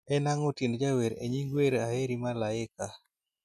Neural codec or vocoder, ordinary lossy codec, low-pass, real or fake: none; none; 14.4 kHz; real